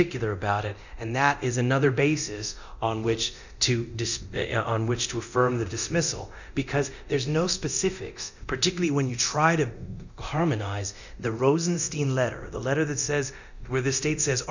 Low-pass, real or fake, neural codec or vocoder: 7.2 kHz; fake; codec, 24 kHz, 0.9 kbps, DualCodec